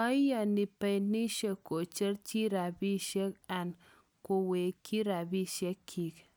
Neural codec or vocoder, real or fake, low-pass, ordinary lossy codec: none; real; none; none